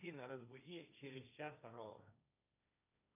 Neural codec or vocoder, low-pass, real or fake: codec, 16 kHz, 1.1 kbps, Voila-Tokenizer; 3.6 kHz; fake